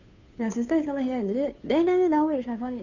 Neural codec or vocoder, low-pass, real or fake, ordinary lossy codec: codec, 16 kHz, 2 kbps, FunCodec, trained on Chinese and English, 25 frames a second; 7.2 kHz; fake; none